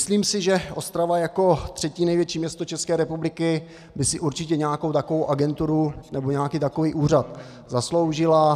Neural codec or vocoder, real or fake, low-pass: none; real; 14.4 kHz